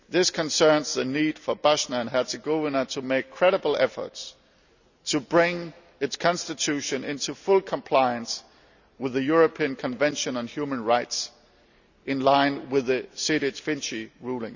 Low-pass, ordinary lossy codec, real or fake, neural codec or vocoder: 7.2 kHz; none; real; none